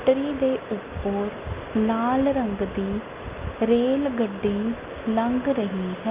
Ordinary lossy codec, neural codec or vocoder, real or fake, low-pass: Opus, 24 kbps; none; real; 3.6 kHz